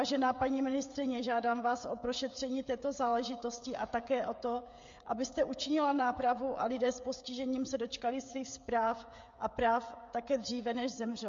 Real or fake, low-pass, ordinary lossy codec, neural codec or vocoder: fake; 7.2 kHz; MP3, 48 kbps; codec, 16 kHz, 16 kbps, FreqCodec, smaller model